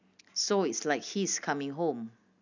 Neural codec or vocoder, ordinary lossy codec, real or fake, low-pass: none; none; real; 7.2 kHz